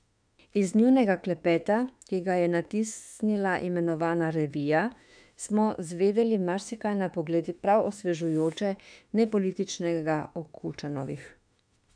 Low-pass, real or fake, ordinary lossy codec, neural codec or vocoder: 9.9 kHz; fake; none; autoencoder, 48 kHz, 32 numbers a frame, DAC-VAE, trained on Japanese speech